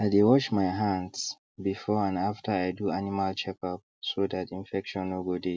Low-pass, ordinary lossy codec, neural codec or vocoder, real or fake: none; none; none; real